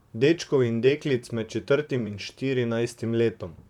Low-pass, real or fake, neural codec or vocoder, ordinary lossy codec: 19.8 kHz; fake; vocoder, 44.1 kHz, 128 mel bands, Pupu-Vocoder; none